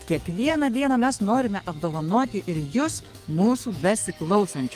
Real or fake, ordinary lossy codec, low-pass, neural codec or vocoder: fake; Opus, 32 kbps; 14.4 kHz; codec, 44.1 kHz, 2.6 kbps, SNAC